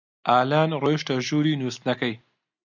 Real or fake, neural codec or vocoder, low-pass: real; none; 7.2 kHz